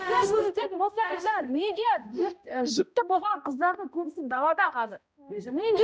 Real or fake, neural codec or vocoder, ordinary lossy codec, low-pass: fake; codec, 16 kHz, 0.5 kbps, X-Codec, HuBERT features, trained on balanced general audio; none; none